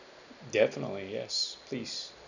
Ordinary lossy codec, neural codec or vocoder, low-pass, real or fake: none; none; 7.2 kHz; real